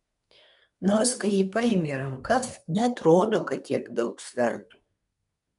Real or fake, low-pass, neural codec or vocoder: fake; 10.8 kHz; codec, 24 kHz, 1 kbps, SNAC